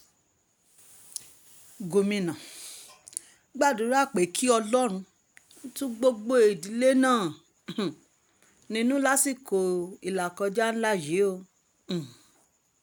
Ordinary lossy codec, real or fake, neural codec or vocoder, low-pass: none; real; none; none